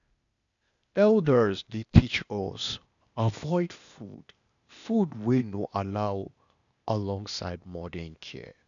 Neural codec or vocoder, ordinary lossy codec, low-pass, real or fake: codec, 16 kHz, 0.8 kbps, ZipCodec; none; 7.2 kHz; fake